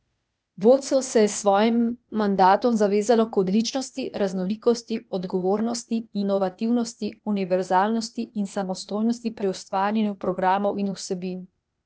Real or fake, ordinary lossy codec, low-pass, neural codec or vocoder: fake; none; none; codec, 16 kHz, 0.8 kbps, ZipCodec